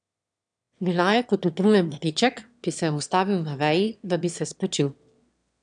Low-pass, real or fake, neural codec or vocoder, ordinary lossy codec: 9.9 kHz; fake; autoencoder, 22.05 kHz, a latent of 192 numbers a frame, VITS, trained on one speaker; none